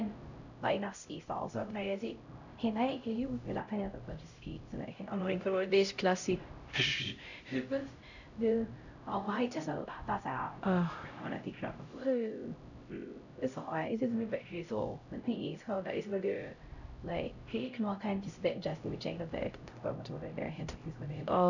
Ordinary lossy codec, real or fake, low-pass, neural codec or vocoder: none; fake; 7.2 kHz; codec, 16 kHz, 0.5 kbps, X-Codec, HuBERT features, trained on LibriSpeech